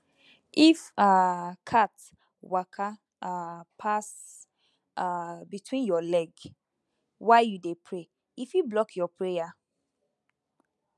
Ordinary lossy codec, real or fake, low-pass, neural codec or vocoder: none; real; none; none